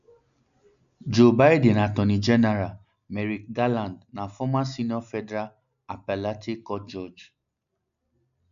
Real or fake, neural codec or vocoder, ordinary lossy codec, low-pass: real; none; none; 7.2 kHz